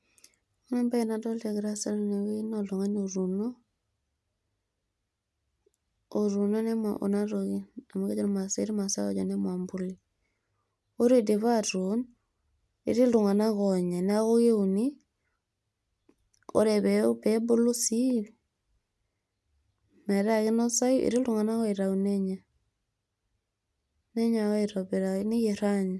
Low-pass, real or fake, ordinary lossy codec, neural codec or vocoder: none; real; none; none